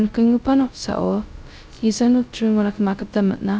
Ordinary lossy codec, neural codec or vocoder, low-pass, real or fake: none; codec, 16 kHz, 0.2 kbps, FocalCodec; none; fake